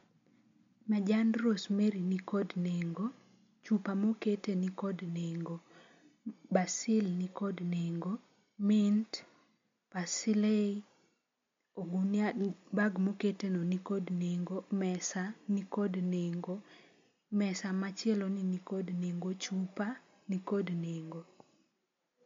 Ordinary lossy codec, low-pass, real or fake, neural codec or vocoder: MP3, 48 kbps; 7.2 kHz; real; none